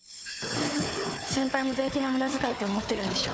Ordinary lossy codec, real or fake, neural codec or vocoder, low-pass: none; fake; codec, 16 kHz, 4 kbps, FunCodec, trained on Chinese and English, 50 frames a second; none